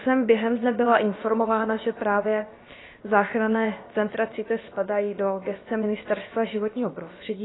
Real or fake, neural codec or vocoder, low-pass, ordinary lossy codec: fake; codec, 16 kHz, about 1 kbps, DyCAST, with the encoder's durations; 7.2 kHz; AAC, 16 kbps